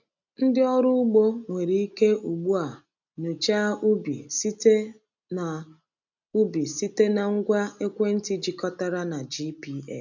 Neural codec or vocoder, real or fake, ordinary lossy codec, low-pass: none; real; none; 7.2 kHz